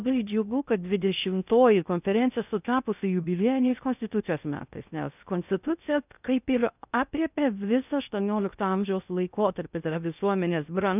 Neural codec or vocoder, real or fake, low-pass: codec, 16 kHz in and 24 kHz out, 0.6 kbps, FocalCodec, streaming, 2048 codes; fake; 3.6 kHz